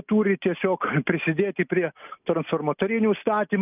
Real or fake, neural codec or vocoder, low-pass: real; none; 3.6 kHz